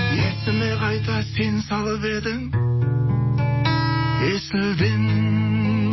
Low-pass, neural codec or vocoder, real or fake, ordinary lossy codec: 7.2 kHz; none; real; MP3, 24 kbps